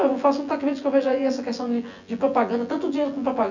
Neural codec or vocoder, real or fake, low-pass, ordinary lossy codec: vocoder, 24 kHz, 100 mel bands, Vocos; fake; 7.2 kHz; none